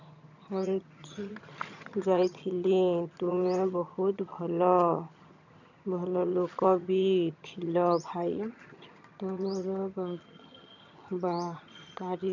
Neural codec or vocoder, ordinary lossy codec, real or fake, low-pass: vocoder, 22.05 kHz, 80 mel bands, HiFi-GAN; none; fake; 7.2 kHz